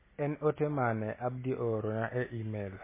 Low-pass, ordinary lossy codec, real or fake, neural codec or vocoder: 3.6 kHz; MP3, 16 kbps; real; none